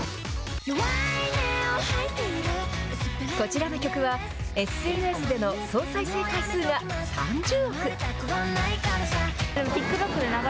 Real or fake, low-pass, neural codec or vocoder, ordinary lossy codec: real; none; none; none